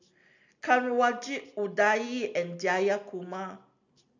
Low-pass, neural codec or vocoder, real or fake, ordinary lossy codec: 7.2 kHz; none; real; none